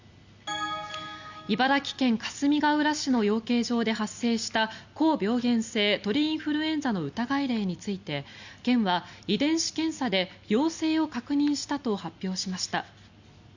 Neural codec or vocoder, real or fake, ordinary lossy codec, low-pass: none; real; Opus, 64 kbps; 7.2 kHz